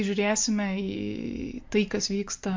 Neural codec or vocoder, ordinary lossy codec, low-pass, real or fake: none; MP3, 48 kbps; 7.2 kHz; real